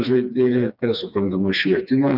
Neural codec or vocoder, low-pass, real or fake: codec, 16 kHz, 2 kbps, FreqCodec, smaller model; 5.4 kHz; fake